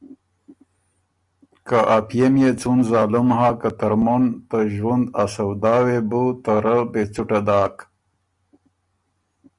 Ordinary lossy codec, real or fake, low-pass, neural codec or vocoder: Opus, 64 kbps; real; 10.8 kHz; none